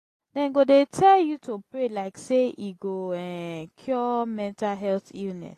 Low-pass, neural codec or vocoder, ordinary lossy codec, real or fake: 14.4 kHz; none; AAC, 48 kbps; real